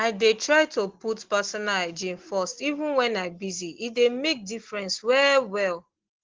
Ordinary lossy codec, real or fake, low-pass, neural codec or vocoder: Opus, 16 kbps; real; 7.2 kHz; none